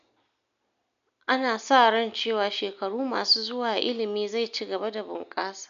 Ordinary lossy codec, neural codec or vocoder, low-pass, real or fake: none; none; 7.2 kHz; real